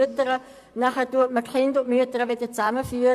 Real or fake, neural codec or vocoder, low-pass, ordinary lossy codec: fake; vocoder, 44.1 kHz, 128 mel bands, Pupu-Vocoder; 14.4 kHz; AAC, 96 kbps